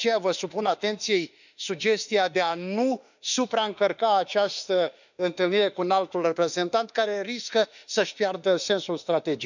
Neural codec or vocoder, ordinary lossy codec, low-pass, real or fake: autoencoder, 48 kHz, 32 numbers a frame, DAC-VAE, trained on Japanese speech; none; 7.2 kHz; fake